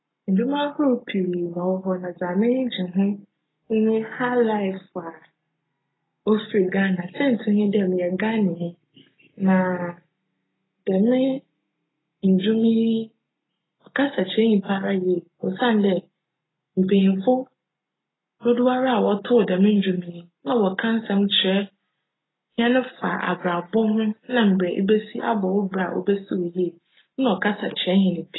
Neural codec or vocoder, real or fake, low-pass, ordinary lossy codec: none; real; 7.2 kHz; AAC, 16 kbps